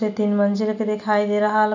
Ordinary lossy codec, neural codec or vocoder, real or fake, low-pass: none; none; real; 7.2 kHz